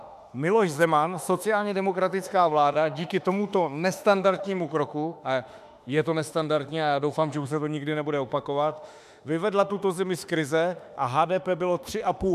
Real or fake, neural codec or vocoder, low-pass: fake; autoencoder, 48 kHz, 32 numbers a frame, DAC-VAE, trained on Japanese speech; 14.4 kHz